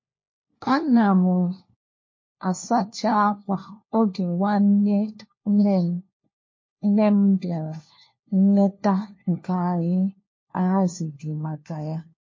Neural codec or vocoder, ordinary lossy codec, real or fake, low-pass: codec, 16 kHz, 1 kbps, FunCodec, trained on LibriTTS, 50 frames a second; MP3, 32 kbps; fake; 7.2 kHz